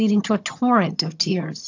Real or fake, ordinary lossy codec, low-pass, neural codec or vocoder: fake; AAC, 48 kbps; 7.2 kHz; vocoder, 22.05 kHz, 80 mel bands, HiFi-GAN